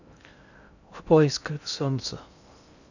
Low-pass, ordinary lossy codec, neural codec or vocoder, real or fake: 7.2 kHz; none; codec, 16 kHz in and 24 kHz out, 0.6 kbps, FocalCodec, streaming, 2048 codes; fake